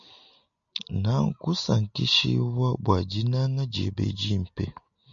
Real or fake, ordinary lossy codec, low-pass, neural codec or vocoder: real; MP3, 96 kbps; 7.2 kHz; none